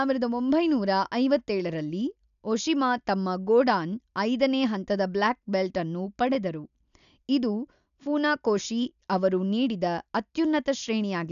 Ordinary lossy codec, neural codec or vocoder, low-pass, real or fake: none; none; 7.2 kHz; real